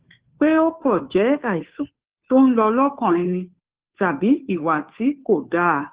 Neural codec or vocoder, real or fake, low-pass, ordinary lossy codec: codec, 16 kHz, 2 kbps, FunCodec, trained on Chinese and English, 25 frames a second; fake; 3.6 kHz; Opus, 16 kbps